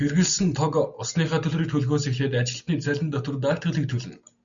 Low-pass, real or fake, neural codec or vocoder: 7.2 kHz; real; none